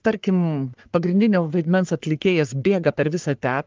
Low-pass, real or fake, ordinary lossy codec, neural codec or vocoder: 7.2 kHz; fake; Opus, 32 kbps; codec, 44.1 kHz, 3.4 kbps, Pupu-Codec